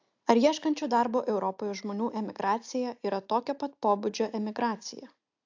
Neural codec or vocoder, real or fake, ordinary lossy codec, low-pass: none; real; AAC, 48 kbps; 7.2 kHz